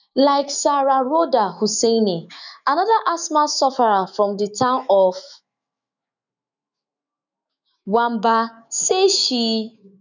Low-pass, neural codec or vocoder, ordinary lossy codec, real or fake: 7.2 kHz; autoencoder, 48 kHz, 128 numbers a frame, DAC-VAE, trained on Japanese speech; none; fake